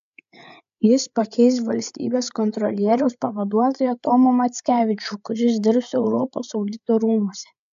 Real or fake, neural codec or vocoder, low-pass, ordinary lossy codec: fake; codec, 16 kHz, 8 kbps, FreqCodec, larger model; 7.2 kHz; AAC, 96 kbps